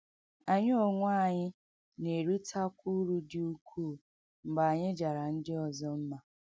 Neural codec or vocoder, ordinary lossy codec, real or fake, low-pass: none; none; real; none